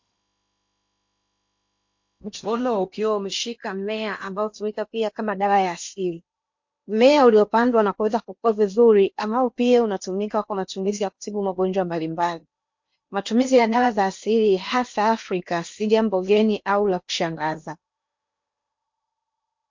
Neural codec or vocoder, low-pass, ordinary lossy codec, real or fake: codec, 16 kHz in and 24 kHz out, 0.8 kbps, FocalCodec, streaming, 65536 codes; 7.2 kHz; MP3, 48 kbps; fake